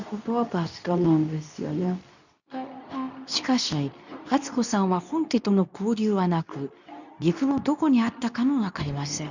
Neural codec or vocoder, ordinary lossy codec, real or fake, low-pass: codec, 24 kHz, 0.9 kbps, WavTokenizer, medium speech release version 2; none; fake; 7.2 kHz